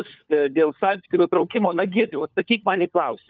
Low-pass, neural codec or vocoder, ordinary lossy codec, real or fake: 7.2 kHz; codec, 16 kHz, 4 kbps, FunCodec, trained on LibriTTS, 50 frames a second; Opus, 24 kbps; fake